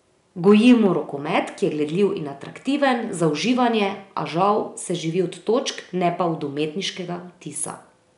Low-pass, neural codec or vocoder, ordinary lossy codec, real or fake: 10.8 kHz; none; none; real